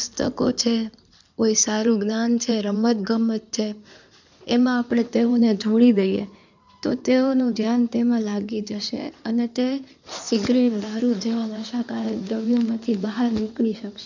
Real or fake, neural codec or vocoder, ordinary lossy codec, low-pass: fake; codec, 16 kHz in and 24 kHz out, 2.2 kbps, FireRedTTS-2 codec; none; 7.2 kHz